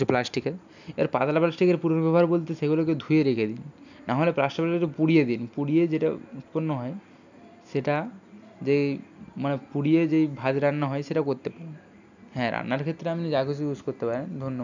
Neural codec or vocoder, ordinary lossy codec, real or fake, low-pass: none; none; real; 7.2 kHz